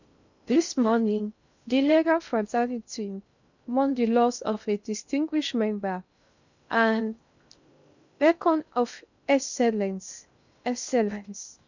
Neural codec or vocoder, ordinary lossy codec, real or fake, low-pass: codec, 16 kHz in and 24 kHz out, 0.6 kbps, FocalCodec, streaming, 2048 codes; none; fake; 7.2 kHz